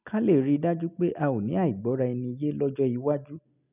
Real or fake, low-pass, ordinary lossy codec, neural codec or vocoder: real; 3.6 kHz; none; none